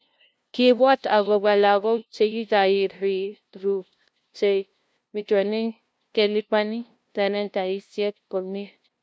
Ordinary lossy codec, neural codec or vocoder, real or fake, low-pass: none; codec, 16 kHz, 0.5 kbps, FunCodec, trained on LibriTTS, 25 frames a second; fake; none